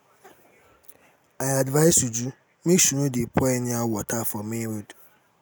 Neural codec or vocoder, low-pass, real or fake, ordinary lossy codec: none; none; real; none